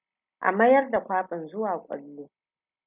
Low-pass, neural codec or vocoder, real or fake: 3.6 kHz; none; real